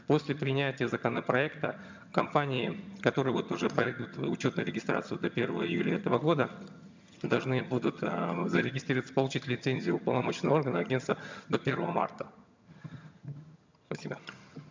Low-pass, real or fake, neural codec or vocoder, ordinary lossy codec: 7.2 kHz; fake; vocoder, 22.05 kHz, 80 mel bands, HiFi-GAN; MP3, 64 kbps